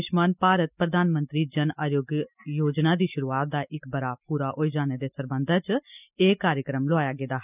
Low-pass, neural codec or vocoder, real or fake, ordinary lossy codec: 3.6 kHz; none; real; none